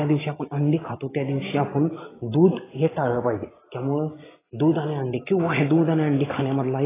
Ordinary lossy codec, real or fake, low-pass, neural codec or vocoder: AAC, 16 kbps; real; 3.6 kHz; none